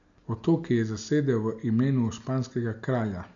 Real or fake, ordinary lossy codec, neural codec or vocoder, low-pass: real; MP3, 96 kbps; none; 7.2 kHz